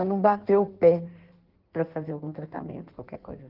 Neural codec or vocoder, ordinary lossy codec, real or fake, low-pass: codec, 16 kHz in and 24 kHz out, 1.1 kbps, FireRedTTS-2 codec; Opus, 16 kbps; fake; 5.4 kHz